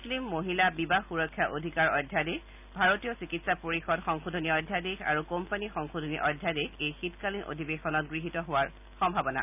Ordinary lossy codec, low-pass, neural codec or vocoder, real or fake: none; 3.6 kHz; none; real